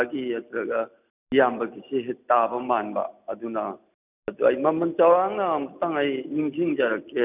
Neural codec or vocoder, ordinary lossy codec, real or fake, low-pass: none; none; real; 3.6 kHz